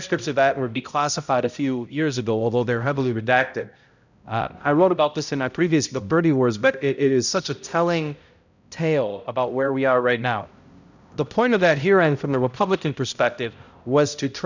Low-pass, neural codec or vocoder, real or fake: 7.2 kHz; codec, 16 kHz, 0.5 kbps, X-Codec, HuBERT features, trained on balanced general audio; fake